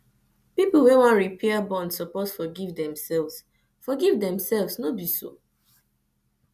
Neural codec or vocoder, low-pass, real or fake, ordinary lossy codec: none; 14.4 kHz; real; none